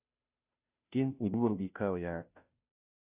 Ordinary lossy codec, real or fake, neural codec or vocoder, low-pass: Opus, 64 kbps; fake; codec, 16 kHz, 0.5 kbps, FunCodec, trained on Chinese and English, 25 frames a second; 3.6 kHz